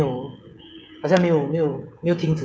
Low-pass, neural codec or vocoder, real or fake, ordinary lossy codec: none; codec, 16 kHz, 16 kbps, FreqCodec, smaller model; fake; none